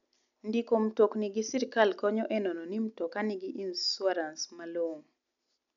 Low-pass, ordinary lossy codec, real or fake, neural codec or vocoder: 7.2 kHz; none; real; none